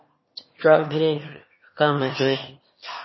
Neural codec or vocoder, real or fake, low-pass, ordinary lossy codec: autoencoder, 22.05 kHz, a latent of 192 numbers a frame, VITS, trained on one speaker; fake; 7.2 kHz; MP3, 24 kbps